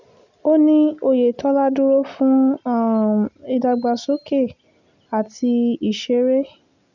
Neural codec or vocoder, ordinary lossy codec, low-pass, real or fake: none; none; 7.2 kHz; real